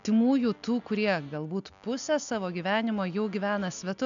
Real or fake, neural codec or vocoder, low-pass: real; none; 7.2 kHz